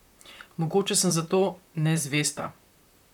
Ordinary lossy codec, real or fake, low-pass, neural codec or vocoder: none; fake; 19.8 kHz; vocoder, 44.1 kHz, 128 mel bands, Pupu-Vocoder